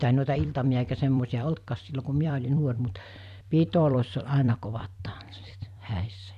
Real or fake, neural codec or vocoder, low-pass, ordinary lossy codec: fake; vocoder, 44.1 kHz, 128 mel bands every 256 samples, BigVGAN v2; 14.4 kHz; none